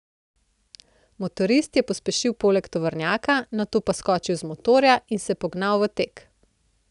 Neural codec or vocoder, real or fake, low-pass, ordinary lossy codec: none; real; 10.8 kHz; none